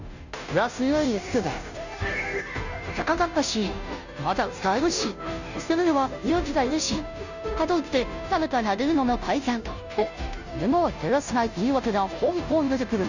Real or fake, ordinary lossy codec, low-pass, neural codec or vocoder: fake; none; 7.2 kHz; codec, 16 kHz, 0.5 kbps, FunCodec, trained on Chinese and English, 25 frames a second